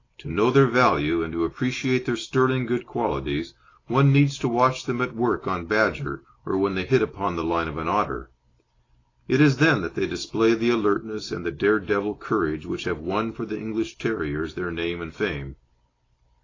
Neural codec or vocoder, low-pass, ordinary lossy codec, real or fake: none; 7.2 kHz; AAC, 32 kbps; real